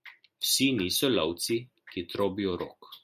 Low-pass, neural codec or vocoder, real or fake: 14.4 kHz; none; real